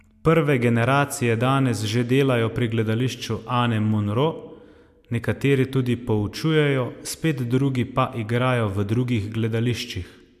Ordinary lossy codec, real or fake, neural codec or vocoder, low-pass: MP3, 96 kbps; real; none; 14.4 kHz